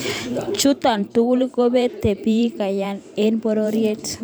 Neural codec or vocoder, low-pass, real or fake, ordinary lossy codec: vocoder, 44.1 kHz, 128 mel bands every 512 samples, BigVGAN v2; none; fake; none